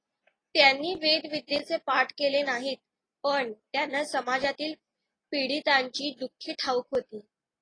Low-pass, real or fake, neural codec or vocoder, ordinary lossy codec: 9.9 kHz; real; none; AAC, 32 kbps